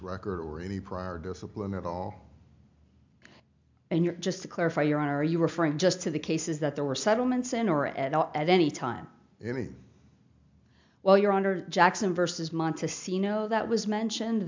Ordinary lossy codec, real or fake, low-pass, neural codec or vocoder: MP3, 64 kbps; real; 7.2 kHz; none